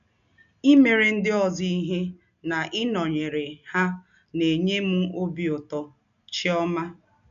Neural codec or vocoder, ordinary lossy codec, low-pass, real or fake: none; none; 7.2 kHz; real